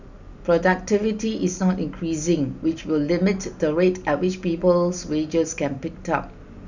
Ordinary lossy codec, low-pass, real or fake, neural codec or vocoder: none; 7.2 kHz; real; none